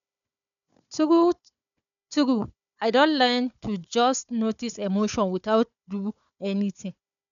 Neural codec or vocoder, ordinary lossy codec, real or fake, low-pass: codec, 16 kHz, 4 kbps, FunCodec, trained on Chinese and English, 50 frames a second; none; fake; 7.2 kHz